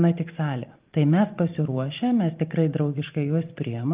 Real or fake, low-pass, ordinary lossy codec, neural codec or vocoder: fake; 3.6 kHz; Opus, 32 kbps; vocoder, 44.1 kHz, 128 mel bands every 512 samples, BigVGAN v2